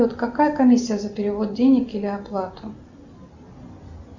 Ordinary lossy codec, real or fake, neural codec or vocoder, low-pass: Opus, 64 kbps; real; none; 7.2 kHz